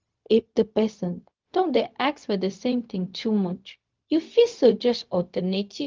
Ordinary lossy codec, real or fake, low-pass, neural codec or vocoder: Opus, 24 kbps; fake; 7.2 kHz; codec, 16 kHz, 0.4 kbps, LongCat-Audio-Codec